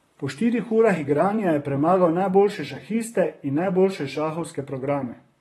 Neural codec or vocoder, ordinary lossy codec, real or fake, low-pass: vocoder, 44.1 kHz, 128 mel bands, Pupu-Vocoder; AAC, 32 kbps; fake; 19.8 kHz